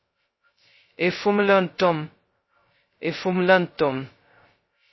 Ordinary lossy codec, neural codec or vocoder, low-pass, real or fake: MP3, 24 kbps; codec, 16 kHz, 0.2 kbps, FocalCodec; 7.2 kHz; fake